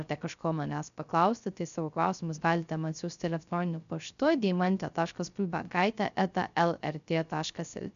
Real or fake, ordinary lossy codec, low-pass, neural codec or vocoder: fake; MP3, 96 kbps; 7.2 kHz; codec, 16 kHz, 0.3 kbps, FocalCodec